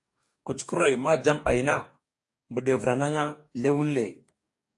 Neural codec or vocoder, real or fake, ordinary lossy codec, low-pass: codec, 44.1 kHz, 2.6 kbps, DAC; fake; AAC, 64 kbps; 10.8 kHz